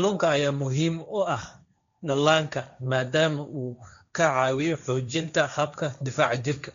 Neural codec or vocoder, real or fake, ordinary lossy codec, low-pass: codec, 16 kHz, 1.1 kbps, Voila-Tokenizer; fake; MP3, 64 kbps; 7.2 kHz